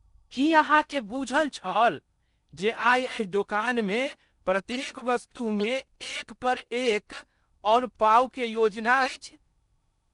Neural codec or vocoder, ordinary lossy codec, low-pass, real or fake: codec, 16 kHz in and 24 kHz out, 0.6 kbps, FocalCodec, streaming, 4096 codes; none; 10.8 kHz; fake